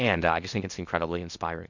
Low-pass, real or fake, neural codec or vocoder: 7.2 kHz; fake; codec, 16 kHz in and 24 kHz out, 0.8 kbps, FocalCodec, streaming, 65536 codes